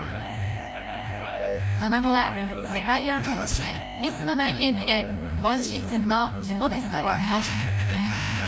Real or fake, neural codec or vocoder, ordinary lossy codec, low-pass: fake; codec, 16 kHz, 0.5 kbps, FreqCodec, larger model; none; none